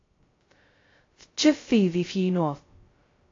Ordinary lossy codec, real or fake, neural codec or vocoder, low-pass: AAC, 32 kbps; fake; codec, 16 kHz, 0.2 kbps, FocalCodec; 7.2 kHz